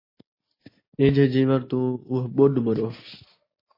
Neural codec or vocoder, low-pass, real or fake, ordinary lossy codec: none; 5.4 kHz; real; MP3, 24 kbps